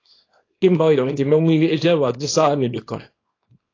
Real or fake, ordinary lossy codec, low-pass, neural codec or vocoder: fake; AAC, 32 kbps; 7.2 kHz; codec, 24 kHz, 0.9 kbps, WavTokenizer, small release